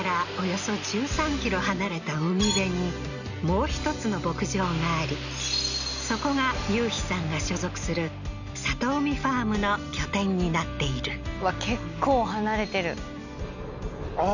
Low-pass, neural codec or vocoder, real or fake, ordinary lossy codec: 7.2 kHz; none; real; none